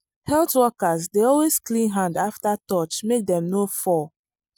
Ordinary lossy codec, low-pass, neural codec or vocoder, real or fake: none; none; none; real